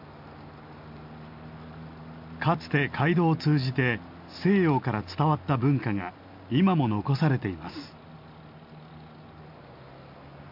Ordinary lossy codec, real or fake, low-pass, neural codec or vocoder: MP3, 48 kbps; real; 5.4 kHz; none